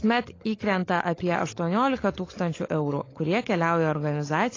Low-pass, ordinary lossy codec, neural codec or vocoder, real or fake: 7.2 kHz; AAC, 32 kbps; codec, 16 kHz, 16 kbps, FunCodec, trained on LibriTTS, 50 frames a second; fake